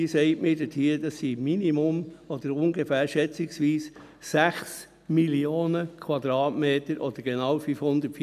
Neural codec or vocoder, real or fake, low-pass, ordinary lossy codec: none; real; 14.4 kHz; none